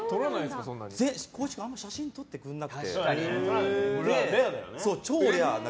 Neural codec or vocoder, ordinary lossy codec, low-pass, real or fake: none; none; none; real